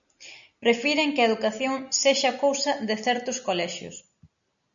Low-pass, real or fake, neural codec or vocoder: 7.2 kHz; real; none